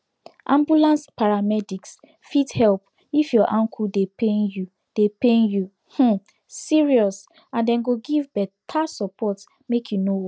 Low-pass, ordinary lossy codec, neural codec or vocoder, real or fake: none; none; none; real